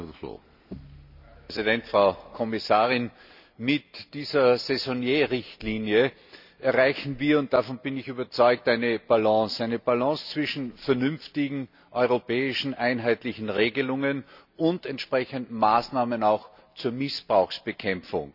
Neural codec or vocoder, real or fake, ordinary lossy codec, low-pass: none; real; none; 5.4 kHz